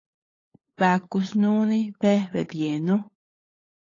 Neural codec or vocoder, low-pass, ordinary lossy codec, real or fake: codec, 16 kHz, 8 kbps, FunCodec, trained on LibriTTS, 25 frames a second; 7.2 kHz; AAC, 32 kbps; fake